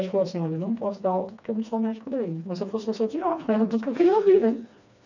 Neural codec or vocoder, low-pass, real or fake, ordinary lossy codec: codec, 16 kHz, 2 kbps, FreqCodec, smaller model; 7.2 kHz; fake; none